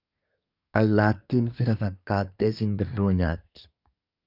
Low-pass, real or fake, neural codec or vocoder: 5.4 kHz; fake; codec, 24 kHz, 1 kbps, SNAC